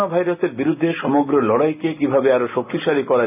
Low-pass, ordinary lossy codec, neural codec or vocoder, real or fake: 3.6 kHz; none; none; real